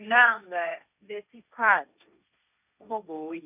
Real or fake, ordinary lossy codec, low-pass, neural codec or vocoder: fake; none; 3.6 kHz; codec, 16 kHz, 1.1 kbps, Voila-Tokenizer